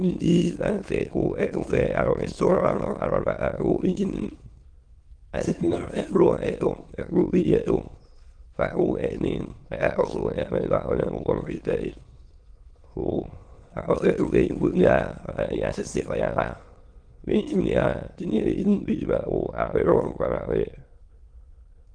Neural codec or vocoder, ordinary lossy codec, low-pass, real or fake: autoencoder, 22.05 kHz, a latent of 192 numbers a frame, VITS, trained on many speakers; Opus, 24 kbps; 9.9 kHz; fake